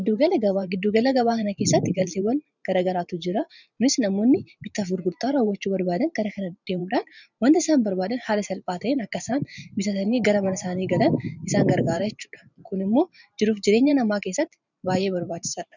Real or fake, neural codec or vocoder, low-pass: fake; vocoder, 44.1 kHz, 128 mel bands every 512 samples, BigVGAN v2; 7.2 kHz